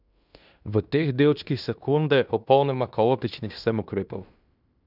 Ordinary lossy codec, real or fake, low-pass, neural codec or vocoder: none; fake; 5.4 kHz; codec, 16 kHz in and 24 kHz out, 0.9 kbps, LongCat-Audio-Codec, fine tuned four codebook decoder